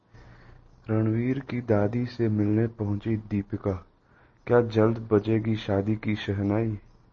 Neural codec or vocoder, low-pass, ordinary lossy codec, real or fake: none; 7.2 kHz; MP3, 32 kbps; real